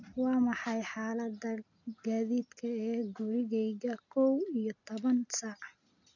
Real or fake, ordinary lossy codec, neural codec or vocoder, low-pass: real; none; none; 7.2 kHz